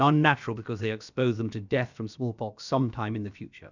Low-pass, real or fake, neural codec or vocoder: 7.2 kHz; fake; codec, 16 kHz, about 1 kbps, DyCAST, with the encoder's durations